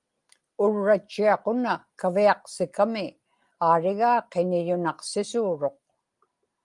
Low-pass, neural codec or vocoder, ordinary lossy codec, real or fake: 10.8 kHz; none; Opus, 32 kbps; real